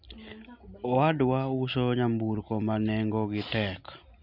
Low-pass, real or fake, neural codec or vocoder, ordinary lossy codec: 5.4 kHz; real; none; none